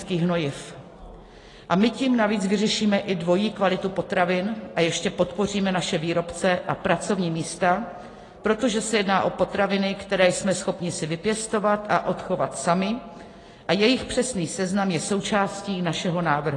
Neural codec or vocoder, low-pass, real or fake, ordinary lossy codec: none; 10.8 kHz; real; AAC, 32 kbps